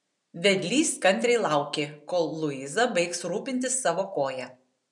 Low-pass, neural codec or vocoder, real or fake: 10.8 kHz; none; real